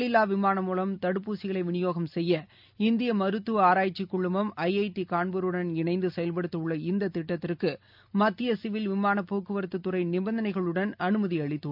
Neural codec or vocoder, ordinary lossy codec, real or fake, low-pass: none; none; real; 5.4 kHz